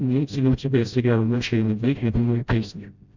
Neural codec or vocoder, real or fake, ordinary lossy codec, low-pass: codec, 16 kHz, 0.5 kbps, FreqCodec, smaller model; fake; Opus, 64 kbps; 7.2 kHz